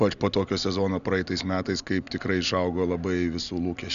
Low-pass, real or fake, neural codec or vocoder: 7.2 kHz; real; none